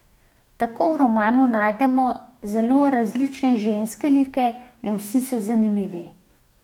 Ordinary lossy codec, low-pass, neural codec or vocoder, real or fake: none; 19.8 kHz; codec, 44.1 kHz, 2.6 kbps, DAC; fake